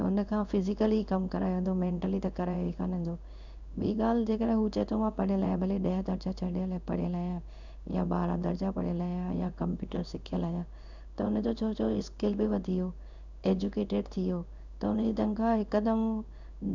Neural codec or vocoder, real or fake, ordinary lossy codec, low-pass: codec, 16 kHz in and 24 kHz out, 1 kbps, XY-Tokenizer; fake; none; 7.2 kHz